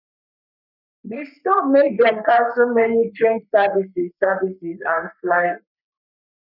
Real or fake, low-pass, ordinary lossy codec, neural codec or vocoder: fake; 5.4 kHz; none; codec, 44.1 kHz, 3.4 kbps, Pupu-Codec